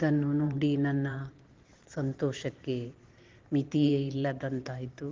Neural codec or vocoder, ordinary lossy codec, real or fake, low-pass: vocoder, 44.1 kHz, 128 mel bands every 512 samples, BigVGAN v2; Opus, 16 kbps; fake; 7.2 kHz